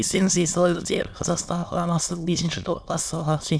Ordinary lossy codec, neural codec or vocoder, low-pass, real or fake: none; autoencoder, 22.05 kHz, a latent of 192 numbers a frame, VITS, trained on many speakers; none; fake